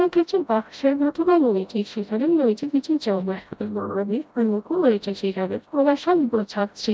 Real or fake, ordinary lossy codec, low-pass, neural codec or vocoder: fake; none; none; codec, 16 kHz, 0.5 kbps, FreqCodec, smaller model